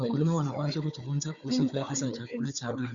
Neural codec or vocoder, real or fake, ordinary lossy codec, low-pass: codec, 16 kHz, 4 kbps, FunCodec, trained on Chinese and English, 50 frames a second; fake; Opus, 64 kbps; 7.2 kHz